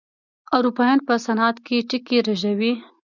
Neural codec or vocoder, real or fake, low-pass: none; real; 7.2 kHz